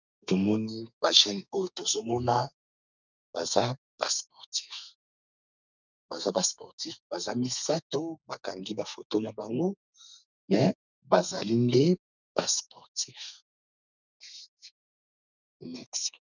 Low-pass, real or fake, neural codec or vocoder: 7.2 kHz; fake; codec, 32 kHz, 1.9 kbps, SNAC